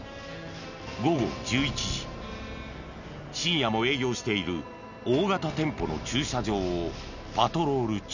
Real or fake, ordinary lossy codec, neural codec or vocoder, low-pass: real; none; none; 7.2 kHz